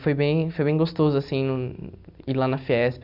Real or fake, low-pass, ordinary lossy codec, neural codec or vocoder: real; 5.4 kHz; none; none